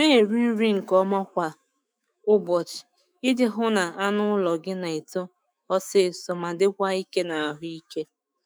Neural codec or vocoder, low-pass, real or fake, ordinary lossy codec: autoencoder, 48 kHz, 128 numbers a frame, DAC-VAE, trained on Japanese speech; none; fake; none